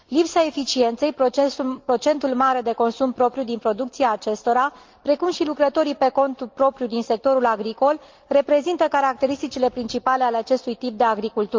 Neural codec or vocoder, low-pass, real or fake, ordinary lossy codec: none; 7.2 kHz; real; Opus, 32 kbps